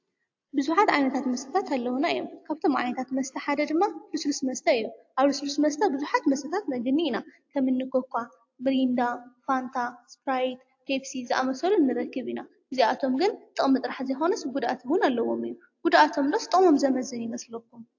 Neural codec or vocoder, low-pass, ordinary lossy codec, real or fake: none; 7.2 kHz; AAC, 48 kbps; real